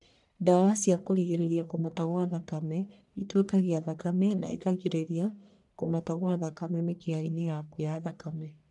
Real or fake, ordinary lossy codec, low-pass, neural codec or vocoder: fake; none; 10.8 kHz; codec, 44.1 kHz, 1.7 kbps, Pupu-Codec